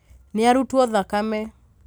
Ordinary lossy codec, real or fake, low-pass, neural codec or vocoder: none; real; none; none